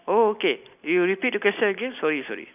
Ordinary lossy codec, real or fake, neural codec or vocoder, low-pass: none; real; none; 3.6 kHz